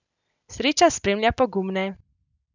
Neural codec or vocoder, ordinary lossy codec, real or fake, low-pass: none; none; real; 7.2 kHz